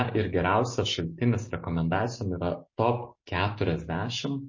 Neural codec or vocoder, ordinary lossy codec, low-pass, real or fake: none; MP3, 32 kbps; 7.2 kHz; real